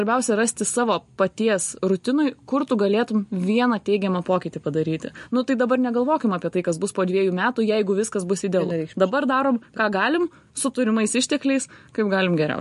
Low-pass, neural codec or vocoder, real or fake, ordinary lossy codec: 14.4 kHz; none; real; MP3, 48 kbps